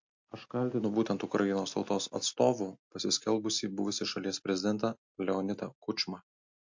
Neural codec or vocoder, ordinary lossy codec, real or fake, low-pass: none; MP3, 48 kbps; real; 7.2 kHz